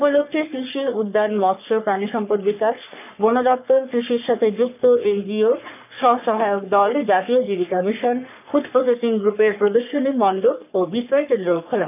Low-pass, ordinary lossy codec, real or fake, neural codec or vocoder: 3.6 kHz; none; fake; codec, 44.1 kHz, 3.4 kbps, Pupu-Codec